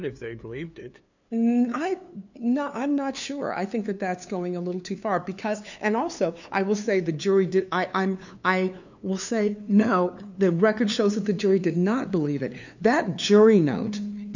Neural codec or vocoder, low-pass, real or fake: codec, 16 kHz, 2 kbps, FunCodec, trained on LibriTTS, 25 frames a second; 7.2 kHz; fake